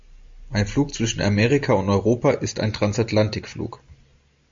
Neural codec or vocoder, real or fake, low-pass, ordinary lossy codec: none; real; 7.2 kHz; MP3, 48 kbps